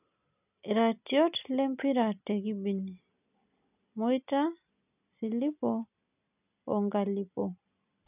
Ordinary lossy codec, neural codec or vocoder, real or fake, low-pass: none; none; real; 3.6 kHz